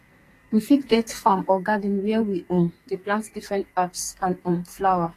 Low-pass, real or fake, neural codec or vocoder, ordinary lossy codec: 14.4 kHz; fake; codec, 44.1 kHz, 2.6 kbps, SNAC; AAC, 48 kbps